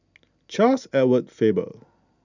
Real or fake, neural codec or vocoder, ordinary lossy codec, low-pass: real; none; none; 7.2 kHz